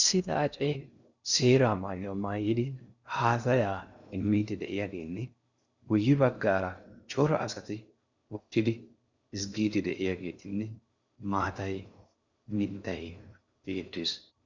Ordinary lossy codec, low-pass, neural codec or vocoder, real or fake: Opus, 64 kbps; 7.2 kHz; codec, 16 kHz in and 24 kHz out, 0.6 kbps, FocalCodec, streaming, 2048 codes; fake